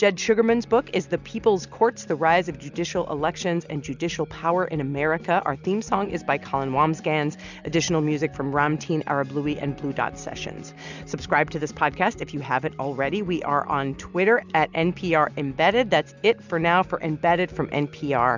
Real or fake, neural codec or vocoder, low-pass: real; none; 7.2 kHz